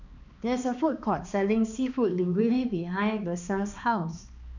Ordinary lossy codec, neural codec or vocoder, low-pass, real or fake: none; codec, 16 kHz, 2 kbps, X-Codec, HuBERT features, trained on balanced general audio; 7.2 kHz; fake